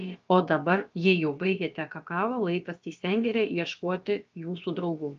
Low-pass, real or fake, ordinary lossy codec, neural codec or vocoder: 7.2 kHz; fake; Opus, 24 kbps; codec, 16 kHz, about 1 kbps, DyCAST, with the encoder's durations